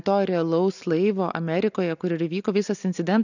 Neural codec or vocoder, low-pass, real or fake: none; 7.2 kHz; real